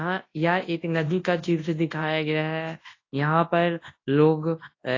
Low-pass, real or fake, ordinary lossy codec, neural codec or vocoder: 7.2 kHz; fake; AAC, 32 kbps; codec, 24 kHz, 0.9 kbps, WavTokenizer, large speech release